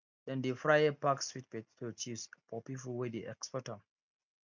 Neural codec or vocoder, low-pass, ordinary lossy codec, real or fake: none; 7.2 kHz; none; real